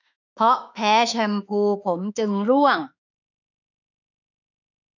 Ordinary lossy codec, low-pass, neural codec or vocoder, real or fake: none; 7.2 kHz; autoencoder, 48 kHz, 32 numbers a frame, DAC-VAE, trained on Japanese speech; fake